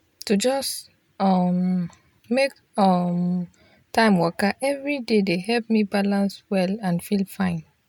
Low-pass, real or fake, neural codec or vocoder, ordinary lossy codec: 19.8 kHz; real; none; MP3, 96 kbps